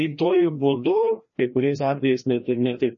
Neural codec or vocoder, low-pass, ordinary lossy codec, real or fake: codec, 16 kHz, 1 kbps, FreqCodec, larger model; 7.2 kHz; MP3, 32 kbps; fake